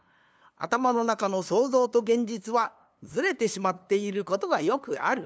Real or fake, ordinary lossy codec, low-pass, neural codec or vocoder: fake; none; none; codec, 16 kHz, 2 kbps, FunCodec, trained on LibriTTS, 25 frames a second